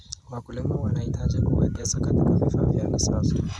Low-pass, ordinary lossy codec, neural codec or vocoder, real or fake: none; none; none; real